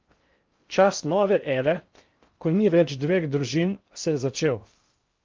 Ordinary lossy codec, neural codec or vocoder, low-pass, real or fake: Opus, 32 kbps; codec, 16 kHz in and 24 kHz out, 0.6 kbps, FocalCodec, streaming, 4096 codes; 7.2 kHz; fake